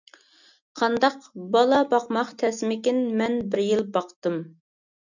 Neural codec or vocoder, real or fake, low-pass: none; real; 7.2 kHz